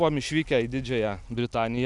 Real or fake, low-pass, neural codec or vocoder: real; 10.8 kHz; none